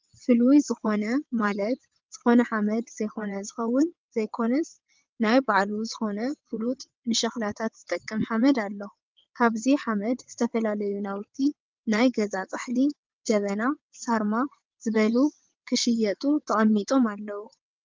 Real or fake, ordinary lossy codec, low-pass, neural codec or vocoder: fake; Opus, 32 kbps; 7.2 kHz; vocoder, 22.05 kHz, 80 mel bands, WaveNeXt